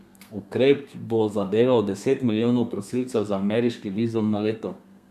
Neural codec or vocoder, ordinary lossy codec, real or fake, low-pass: codec, 32 kHz, 1.9 kbps, SNAC; none; fake; 14.4 kHz